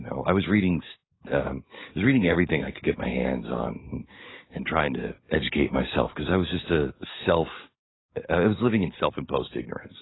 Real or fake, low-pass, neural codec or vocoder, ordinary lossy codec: fake; 7.2 kHz; codec, 44.1 kHz, 7.8 kbps, DAC; AAC, 16 kbps